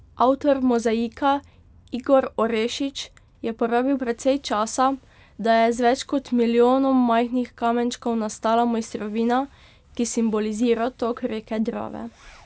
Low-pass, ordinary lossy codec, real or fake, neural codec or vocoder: none; none; real; none